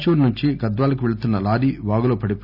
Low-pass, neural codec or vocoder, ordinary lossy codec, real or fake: 5.4 kHz; none; none; real